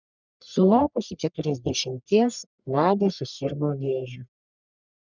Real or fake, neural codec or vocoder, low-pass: fake; codec, 44.1 kHz, 1.7 kbps, Pupu-Codec; 7.2 kHz